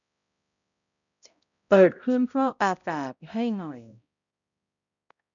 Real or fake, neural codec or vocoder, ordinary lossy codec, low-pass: fake; codec, 16 kHz, 0.5 kbps, X-Codec, HuBERT features, trained on balanced general audio; none; 7.2 kHz